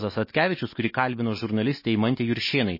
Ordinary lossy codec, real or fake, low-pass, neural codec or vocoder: MP3, 24 kbps; real; 5.4 kHz; none